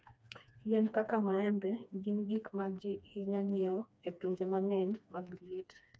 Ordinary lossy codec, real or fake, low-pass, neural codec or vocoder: none; fake; none; codec, 16 kHz, 2 kbps, FreqCodec, smaller model